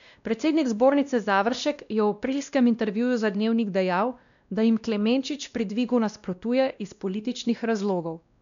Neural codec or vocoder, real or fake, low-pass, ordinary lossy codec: codec, 16 kHz, 1 kbps, X-Codec, WavLM features, trained on Multilingual LibriSpeech; fake; 7.2 kHz; none